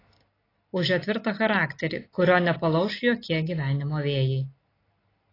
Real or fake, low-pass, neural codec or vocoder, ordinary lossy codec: real; 5.4 kHz; none; AAC, 24 kbps